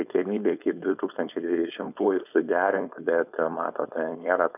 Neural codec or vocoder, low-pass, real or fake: codec, 16 kHz, 4.8 kbps, FACodec; 3.6 kHz; fake